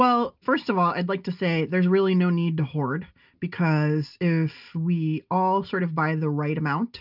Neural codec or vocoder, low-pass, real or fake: none; 5.4 kHz; real